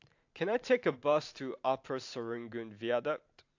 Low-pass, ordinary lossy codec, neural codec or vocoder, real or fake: 7.2 kHz; AAC, 48 kbps; vocoder, 44.1 kHz, 128 mel bands every 512 samples, BigVGAN v2; fake